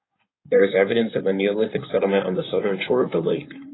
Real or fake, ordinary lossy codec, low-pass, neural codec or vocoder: fake; AAC, 16 kbps; 7.2 kHz; codec, 16 kHz in and 24 kHz out, 2.2 kbps, FireRedTTS-2 codec